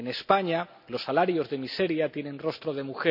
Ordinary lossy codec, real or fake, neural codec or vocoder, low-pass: none; real; none; 5.4 kHz